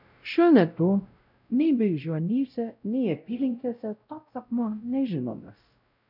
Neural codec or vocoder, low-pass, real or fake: codec, 16 kHz, 0.5 kbps, X-Codec, WavLM features, trained on Multilingual LibriSpeech; 5.4 kHz; fake